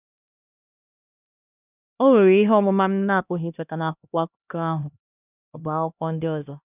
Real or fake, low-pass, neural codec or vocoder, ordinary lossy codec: fake; 3.6 kHz; codec, 16 kHz, 1 kbps, X-Codec, HuBERT features, trained on LibriSpeech; none